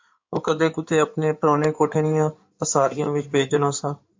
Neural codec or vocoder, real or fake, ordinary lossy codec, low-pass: codec, 16 kHz in and 24 kHz out, 2.2 kbps, FireRedTTS-2 codec; fake; MP3, 64 kbps; 7.2 kHz